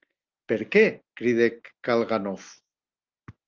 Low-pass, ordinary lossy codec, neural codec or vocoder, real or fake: 7.2 kHz; Opus, 24 kbps; none; real